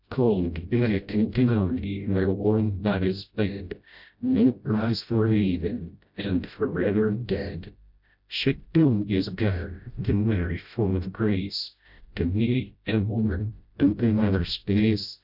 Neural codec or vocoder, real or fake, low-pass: codec, 16 kHz, 0.5 kbps, FreqCodec, smaller model; fake; 5.4 kHz